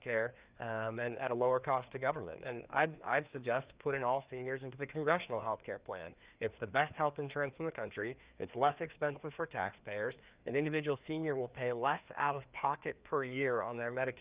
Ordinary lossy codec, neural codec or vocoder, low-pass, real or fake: Opus, 64 kbps; codec, 16 kHz, 2 kbps, FreqCodec, larger model; 3.6 kHz; fake